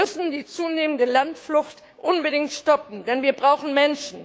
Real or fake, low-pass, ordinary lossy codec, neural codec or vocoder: fake; none; none; codec, 16 kHz, 6 kbps, DAC